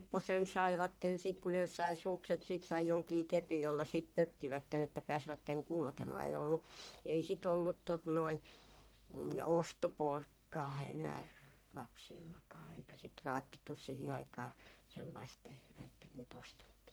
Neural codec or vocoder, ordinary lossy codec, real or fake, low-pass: codec, 44.1 kHz, 1.7 kbps, Pupu-Codec; none; fake; none